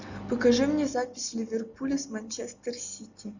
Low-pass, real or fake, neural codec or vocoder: 7.2 kHz; real; none